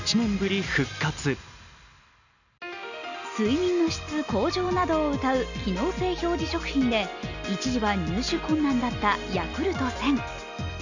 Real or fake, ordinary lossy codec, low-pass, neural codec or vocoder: real; none; 7.2 kHz; none